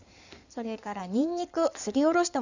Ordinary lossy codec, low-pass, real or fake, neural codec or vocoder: none; 7.2 kHz; fake; codec, 16 kHz in and 24 kHz out, 2.2 kbps, FireRedTTS-2 codec